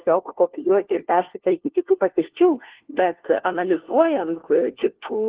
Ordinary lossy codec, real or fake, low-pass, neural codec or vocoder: Opus, 16 kbps; fake; 3.6 kHz; codec, 16 kHz, 1 kbps, FunCodec, trained on LibriTTS, 50 frames a second